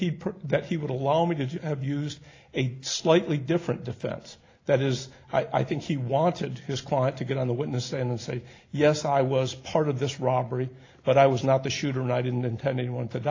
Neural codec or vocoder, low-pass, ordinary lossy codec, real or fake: none; 7.2 kHz; AAC, 32 kbps; real